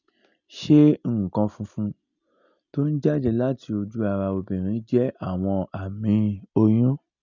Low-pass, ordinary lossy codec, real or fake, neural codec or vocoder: 7.2 kHz; none; real; none